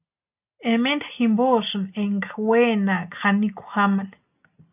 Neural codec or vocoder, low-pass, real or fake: none; 3.6 kHz; real